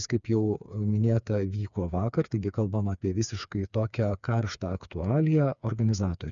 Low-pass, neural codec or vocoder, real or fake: 7.2 kHz; codec, 16 kHz, 4 kbps, FreqCodec, smaller model; fake